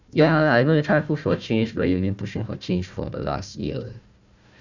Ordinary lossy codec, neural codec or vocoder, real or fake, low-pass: none; codec, 16 kHz, 1 kbps, FunCodec, trained on Chinese and English, 50 frames a second; fake; 7.2 kHz